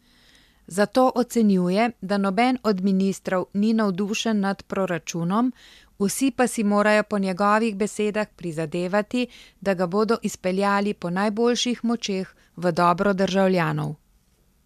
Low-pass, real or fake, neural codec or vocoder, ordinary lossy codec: 14.4 kHz; real; none; MP3, 96 kbps